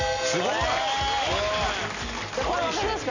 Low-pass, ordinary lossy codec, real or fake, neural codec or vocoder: 7.2 kHz; none; real; none